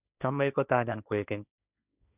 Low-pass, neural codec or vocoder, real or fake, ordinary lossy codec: 3.6 kHz; codec, 16 kHz, 1.1 kbps, Voila-Tokenizer; fake; none